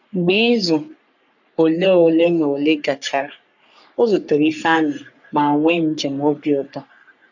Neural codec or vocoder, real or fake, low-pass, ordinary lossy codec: codec, 44.1 kHz, 3.4 kbps, Pupu-Codec; fake; 7.2 kHz; none